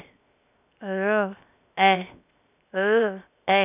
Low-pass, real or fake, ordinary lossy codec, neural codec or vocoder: 3.6 kHz; fake; none; codec, 16 kHz, 0.7 kbps, FocalCodec